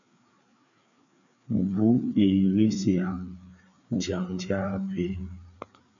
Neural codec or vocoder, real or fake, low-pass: codec, 16 kHz, 4 kbps, FreqCodec, larger model; fake; 7.2 kHz